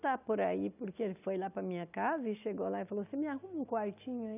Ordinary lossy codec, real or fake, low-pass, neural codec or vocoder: none; real; 3.6 kHz; none